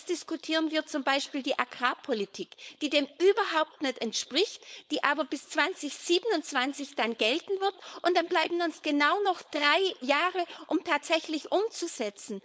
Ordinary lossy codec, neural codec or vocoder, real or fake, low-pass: none; codec, 16 kHz, 4.8 kbps, FACodec; fake; none